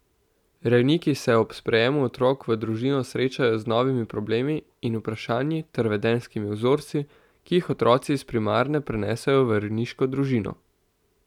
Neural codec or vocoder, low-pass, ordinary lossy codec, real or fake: none; 19.8 kHz; none; real